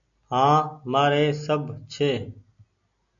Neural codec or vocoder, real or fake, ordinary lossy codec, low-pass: none; real; MP3, 96 kbps; 7.2 kHz